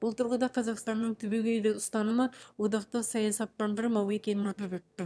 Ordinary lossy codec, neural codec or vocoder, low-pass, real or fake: none; autoencoder, 22.05 kHz, a latent of 192 numbers a frame, VITS, trained on one speaker; none; fake